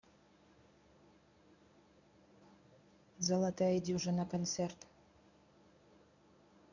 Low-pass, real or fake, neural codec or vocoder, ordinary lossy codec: 7.2 kHz; fake; codec, 24 kHz, 0.9 kbps, WavTokenizer, medium speech release version 1; none